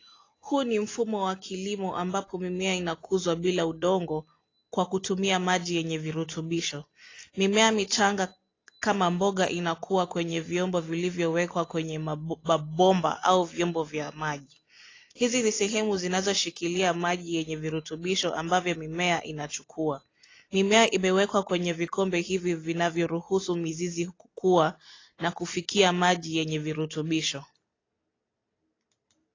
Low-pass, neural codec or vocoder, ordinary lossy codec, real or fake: 7.2 kHz; none; AAC, 32 kbps; real